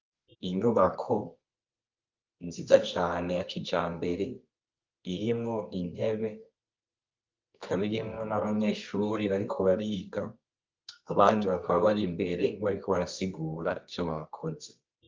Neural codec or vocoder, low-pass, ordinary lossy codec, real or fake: codec, 24 kHz, 0.9 kbps, WavTokenizer, medium music audio release; 7.2 kHz; Opus, 24 kbps; fake